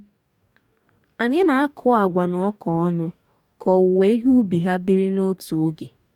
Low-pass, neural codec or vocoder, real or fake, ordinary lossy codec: 19.8 kHz; codec, 44.1 kHz, 2.6 kbps, DAC; fake; none